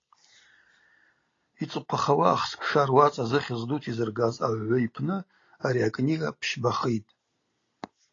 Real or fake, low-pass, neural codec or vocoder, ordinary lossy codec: real; 7.2 kHz; none; AAC, 32 kbps